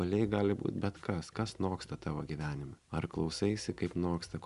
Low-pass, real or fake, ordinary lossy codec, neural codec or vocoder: 10.8 kHz; real; Opus, 64 kbps; none